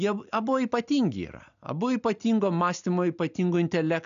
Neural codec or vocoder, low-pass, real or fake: none; 7.2 kHz; real